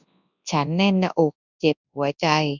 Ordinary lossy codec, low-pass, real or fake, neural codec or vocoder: none; 7.2 kHz; fake; codec, 24 kHz, 0.9 kbps, WavTokenizer, large speech release